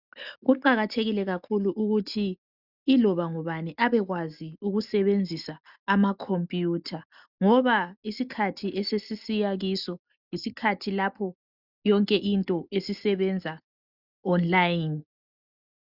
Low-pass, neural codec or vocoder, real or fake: 5.4 kHz; none; real